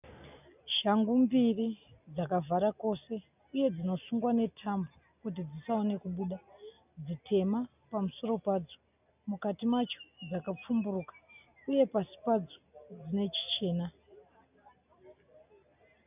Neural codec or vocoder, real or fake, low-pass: none; real; 3.6 kHz